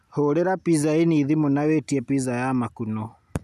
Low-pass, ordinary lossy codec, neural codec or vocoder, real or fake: 14.4 kHz; none; none; real